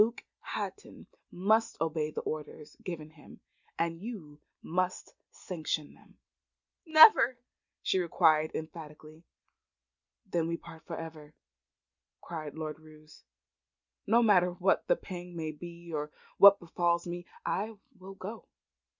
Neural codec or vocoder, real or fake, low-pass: none; real; 7.2 kHz